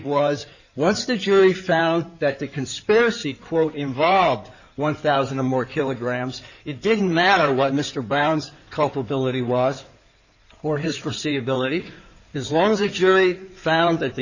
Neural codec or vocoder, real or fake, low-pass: codec, 16 kHz in and 24 kHz out, 2.2 kbps, FireRedTTS-2 codec; fake; 7.2 kHz